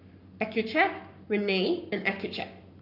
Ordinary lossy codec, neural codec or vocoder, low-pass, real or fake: none; codec, 44.1 kHz, 7.8 kbps, Pupu-Codec; 5.4 kHz; fake